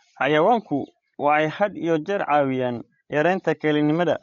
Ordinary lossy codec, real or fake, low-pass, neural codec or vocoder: MP3, 48 kbps; fake; 7.2 kHz; codec, 16 kHz, 16 kbps, FreqCodec, larger model